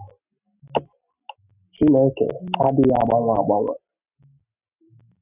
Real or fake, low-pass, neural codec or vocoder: real; 3.6 kHz; none